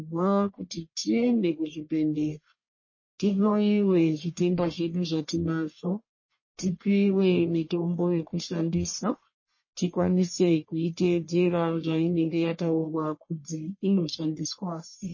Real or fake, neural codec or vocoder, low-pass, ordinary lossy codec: fake; codec, 44.1 kHz, 1.7 kbps, Pupu-Codec; 7.2 kHz; MP3, 32 kbps